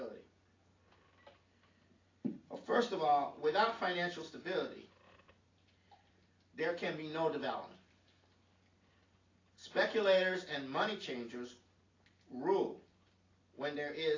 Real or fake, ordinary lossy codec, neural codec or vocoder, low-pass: real; AAC, 32 kbps; none; 7.2 kHz